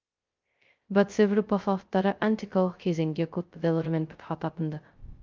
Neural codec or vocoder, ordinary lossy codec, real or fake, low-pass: codec, 16 kHz, 0.2 kbps, FocalCodec; Opus, 32 kbps; fake; 7.2 kHz